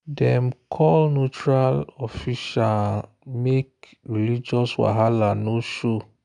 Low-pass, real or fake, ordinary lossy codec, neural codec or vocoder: 9.9 kHz; real; none; none